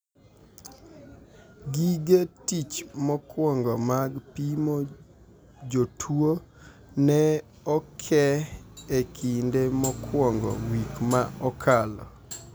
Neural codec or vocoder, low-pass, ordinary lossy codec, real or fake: none; none; none; real